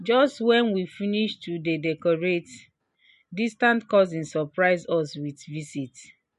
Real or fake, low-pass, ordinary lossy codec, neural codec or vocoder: real; 14.4 kHz; MP3, 48 kbps; none